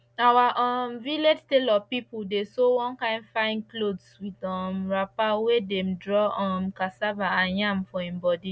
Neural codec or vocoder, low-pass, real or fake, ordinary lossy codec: none; none; real; none